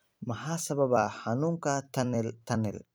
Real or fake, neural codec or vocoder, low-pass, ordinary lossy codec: fake; vocoder, 44.1 kHz, 128 mel bands every 256 samples, BigVGAN v2; none; none